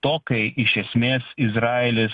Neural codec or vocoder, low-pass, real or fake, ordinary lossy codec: none; 10.8 kHz; real; Opus, 64 kbps